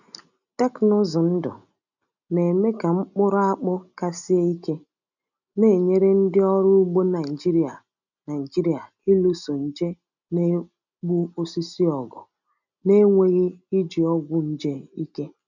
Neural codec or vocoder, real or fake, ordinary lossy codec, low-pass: none; real; none; 7.2 kHz